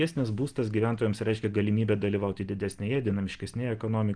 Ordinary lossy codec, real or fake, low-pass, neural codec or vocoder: Opus, 24 kbps; real; 9.9 kHz; none